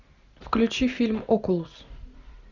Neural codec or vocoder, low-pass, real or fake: none; 7.2 kHz; real